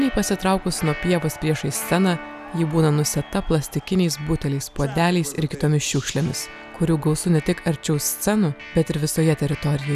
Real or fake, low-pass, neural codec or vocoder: real; 14.4 kHz; none